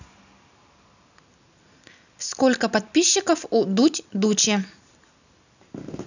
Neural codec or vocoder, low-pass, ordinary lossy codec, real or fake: none; 7.2 kHz; none; real